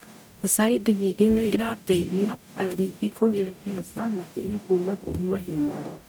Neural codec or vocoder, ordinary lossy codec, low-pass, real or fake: codec, 44.1 kHz, 0.9 kbps, DAC; none; none; fake